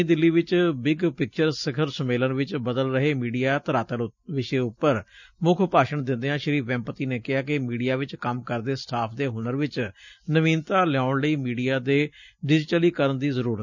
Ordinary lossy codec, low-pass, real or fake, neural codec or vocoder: none; 7.2 kHz; real; none